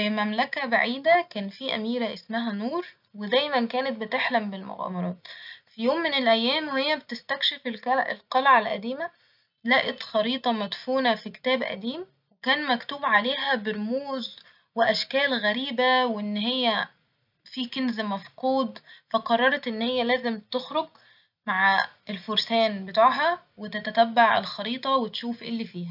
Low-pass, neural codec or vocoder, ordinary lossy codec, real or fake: 5.4 kHz; none; none; real